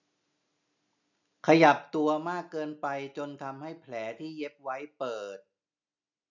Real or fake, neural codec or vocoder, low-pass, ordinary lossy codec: real; none; 7.2 kHz; none